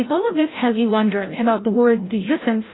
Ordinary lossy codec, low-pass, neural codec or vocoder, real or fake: AAC, 16 kbps; 7.2 kHz; codec, 16 kHz, 0.5 kbps, FreqCodec, larger model; fake